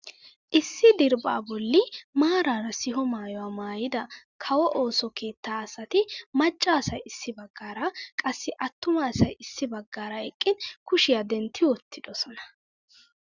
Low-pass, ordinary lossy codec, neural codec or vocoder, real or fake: 7.2 kHz; Opus, 64 kbps; none; real